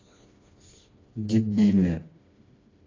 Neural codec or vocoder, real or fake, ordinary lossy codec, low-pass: codec, 16 kHz, 2 kbps, FreqCodec, smaller model; fake; AAC, 32 kbps; 7.2 kHz